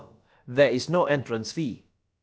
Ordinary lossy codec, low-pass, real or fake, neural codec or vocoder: none; none; fake; codec, 16 kHz, about 1 kbps, DyCAST, with the encoder's durations